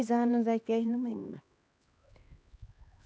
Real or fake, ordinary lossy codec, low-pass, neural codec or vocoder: fake; none; none; codec, 16 kHz, 2 kbps, X-Codec, HuBERT features, trained on LibriSpeech